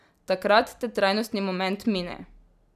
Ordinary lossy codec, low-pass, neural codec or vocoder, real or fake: none; 14.4 kHz; none; real